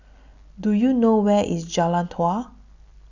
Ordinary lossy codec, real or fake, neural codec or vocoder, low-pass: none; real; none; 7.2 kHz